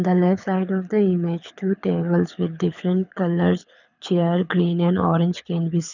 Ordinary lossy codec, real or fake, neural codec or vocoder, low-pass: none; fake; codec, 24 kHz, 6 kbps, HILCodec; 7.2 kHz